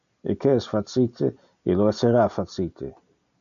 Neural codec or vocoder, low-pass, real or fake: none; 7.2 kHz; real